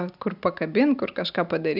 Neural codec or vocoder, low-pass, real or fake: none; 5.4 kHz; real